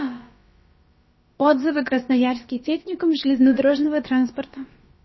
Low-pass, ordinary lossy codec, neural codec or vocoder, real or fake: 7.2 kHz; MP3, 24 kbps; codec, 16 kHz, about 1 kbps, DyCAST, with the encoder's durations; fake